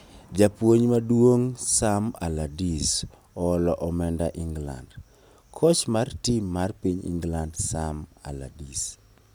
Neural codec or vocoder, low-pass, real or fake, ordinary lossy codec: none; none; real; none